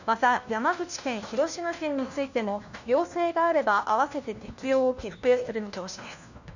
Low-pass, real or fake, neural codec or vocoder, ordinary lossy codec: 7.2 kHz; fake; codec, 16 kHz, 1 kbps, FunCodec, trained on LibriTTS, 50 frames a second; none